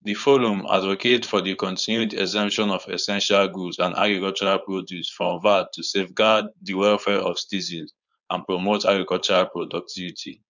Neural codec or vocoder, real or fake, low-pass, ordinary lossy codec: codec, 16 kHz, 4.8 kbps, FACodec; fake; 7.2 kHz; none